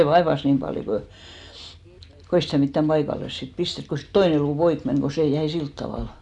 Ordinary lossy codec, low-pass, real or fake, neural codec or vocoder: none; 10.8 kHz; real; none